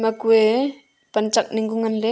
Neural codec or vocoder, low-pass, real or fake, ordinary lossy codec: none; none; real; none